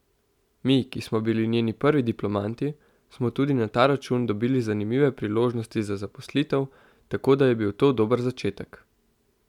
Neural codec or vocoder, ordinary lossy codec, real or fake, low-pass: none; none; real; 19.8 kHz